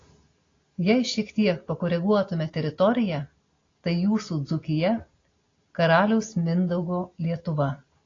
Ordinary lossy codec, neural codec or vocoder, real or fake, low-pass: AAC, 32 kbps; none; real; 7.2 kHz